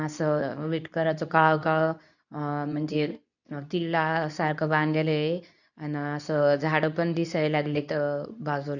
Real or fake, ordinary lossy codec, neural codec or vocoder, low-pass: fake; none; codec, 24 kHz, 0.9 kbps, WavTokenizer, medium speech release version 2; 7.2 kHz